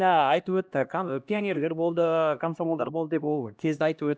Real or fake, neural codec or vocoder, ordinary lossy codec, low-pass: fake; codec, 16 kHz, 1 kbps, X-Codec, HuBERT features, trained on LibriSpeech; none; none